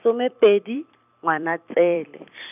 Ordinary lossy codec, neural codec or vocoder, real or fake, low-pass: none; vocoder, 44.1 kHz, 128 mel bands every 256 samples, BigVGAN v2; fake; 3.6 kHz